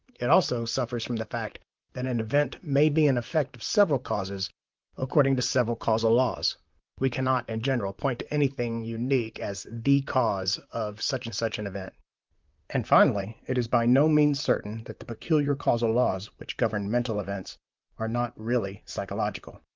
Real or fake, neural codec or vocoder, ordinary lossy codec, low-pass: fake; vocoder, 44.1 kHz, 128 mel bands, Pupu-Vocoder; Opus, 32 kbps; 7.2 kHz